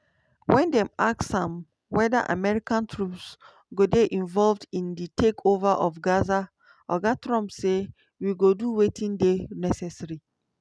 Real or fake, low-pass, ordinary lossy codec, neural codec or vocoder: real; none; none; none